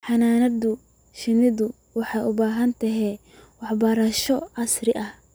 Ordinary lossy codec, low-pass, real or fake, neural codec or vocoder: none; none; real; none